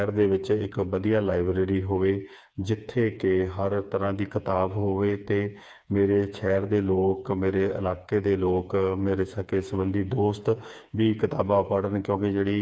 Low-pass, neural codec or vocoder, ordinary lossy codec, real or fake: none; codec, 16 kHz, 4 kbps, FreqCodec, smaller model; none; fake